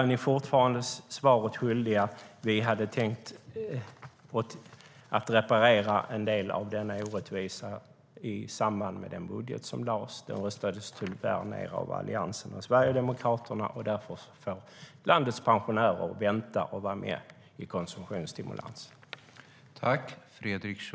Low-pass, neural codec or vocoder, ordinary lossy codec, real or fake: none; none; none; real